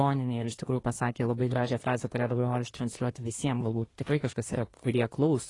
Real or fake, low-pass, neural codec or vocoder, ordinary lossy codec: fake; 10.8 kHz; codec, 24 kHz, 1 kbps, SNAC; AAC, 32 kbps